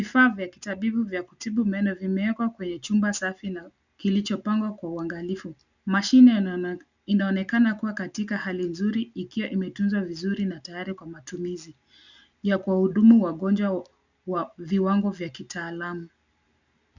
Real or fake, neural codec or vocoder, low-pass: real; none; 7.2 kHz